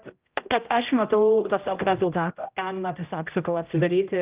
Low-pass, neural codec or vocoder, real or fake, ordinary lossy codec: 3.6 kHz; codec, 16 kHz, 0.5 kbps, X-Codec, HuBERT features, trained on general audio; fake; Opus, 32 kbps